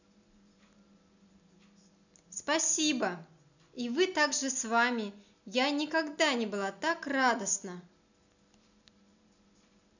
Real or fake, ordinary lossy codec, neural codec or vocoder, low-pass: real; none; none; 7.2 kHz